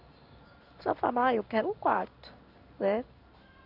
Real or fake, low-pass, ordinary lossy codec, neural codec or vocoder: fake; 5.4 kHz; none; codec, 16 kHz in and 24 kHz out, 1 kbps, XY-Tokenizer